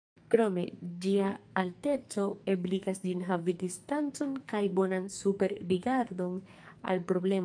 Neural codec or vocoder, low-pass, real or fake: codec, 44.1 kHz, 2.6 kbps, SNAC; 9.9 kHz; fake